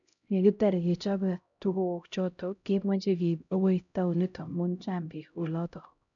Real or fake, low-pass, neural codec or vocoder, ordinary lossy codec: fake; 7.2 kHz; codec, 16 kHz, 0.5 kbps, X-Codec, HuBERT features, trained on LibriSpeech; none